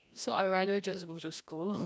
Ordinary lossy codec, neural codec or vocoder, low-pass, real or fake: none; codec, 16 kHz, 1 kbps, FreqCodec, larger model; none; fake